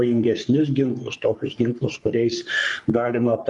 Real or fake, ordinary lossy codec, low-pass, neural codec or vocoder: fake; Opus, 32 kbps; 10.8 kHz; codec, 44.1 kHz, 3.4 kbps, Pupu-Codec